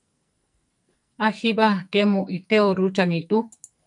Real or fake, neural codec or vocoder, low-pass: fake; codec, 44.1 kHz, 2.6 kbps, SNAC; 10.8 kHz